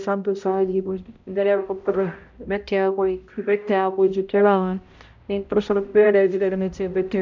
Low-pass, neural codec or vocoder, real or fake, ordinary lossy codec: 7.2 kHz; codec, 16 kHz, 0.5 kbps, X-Codec, HuBERT features, trained on balanced general audio; fake; MP3, 64 kbps